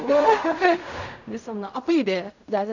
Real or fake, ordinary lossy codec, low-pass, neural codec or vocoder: fake; none; 7.2 kHz; codec, 16 kHz in and 24 kHz out, 0.4 kbps, LongCat-Audio-Codec, fine tuned four codebook decoder